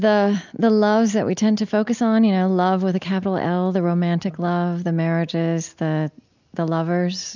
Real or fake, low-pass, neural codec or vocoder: real; 7.2 kHz; none